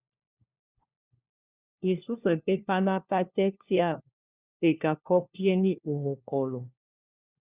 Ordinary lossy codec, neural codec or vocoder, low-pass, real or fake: Opus, 64 kbps; codec, 16 kHz, 1 kbps, FunCodec, trained on LibriTTS, 50 frames a second; 3.6 kHz; fake